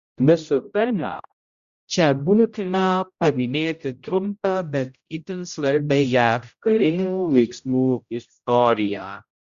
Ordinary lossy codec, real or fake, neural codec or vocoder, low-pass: Opus, 64 kbps; fake; codec, 16 kHz, 0.5 kbps, X-Codec, HuBERT features, trained on general audio; 7.2 kHz